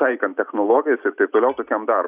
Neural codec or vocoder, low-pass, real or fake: none; 3.6 kHz; real